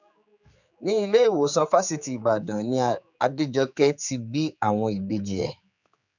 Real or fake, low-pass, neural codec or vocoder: fake; 7.2 kHz; codec, 16 kHz, 4 kbps, X-Codec, HuBERT features, trained on general audio